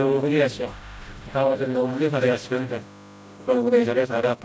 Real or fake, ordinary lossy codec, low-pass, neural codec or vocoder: fake; none; none; codec, 16 kHz, 0.5 kbps, FreqCodec, smaller model